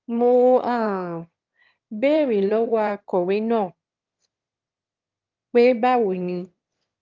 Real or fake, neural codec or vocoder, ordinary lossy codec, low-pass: fake; autoencoder, 22.05 kHz, a latent of 192 numbers a frame, VITS, trained on one speaker; Opus, 32 kbps; 7.2 kHz